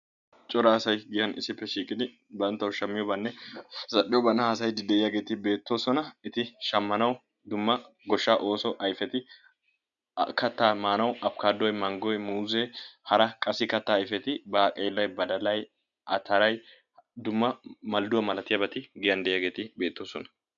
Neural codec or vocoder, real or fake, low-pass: none; real; 7.2 kHz